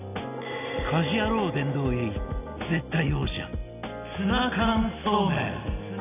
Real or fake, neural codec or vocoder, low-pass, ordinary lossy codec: real; none; 3.6 kHz; none